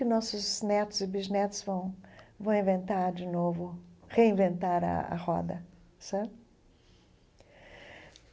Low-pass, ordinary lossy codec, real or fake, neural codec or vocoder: none; none; real; none